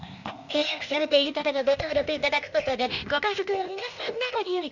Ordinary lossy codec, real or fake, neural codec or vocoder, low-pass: none; fake; codec, 16 kHz, 0.8 kbps, ZipCodec; 7.2 kHz